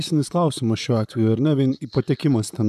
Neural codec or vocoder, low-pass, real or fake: vocoder, 44.1 kHz, 128 mel bands, Pupu-Vocoder; 14.4 kHz; fake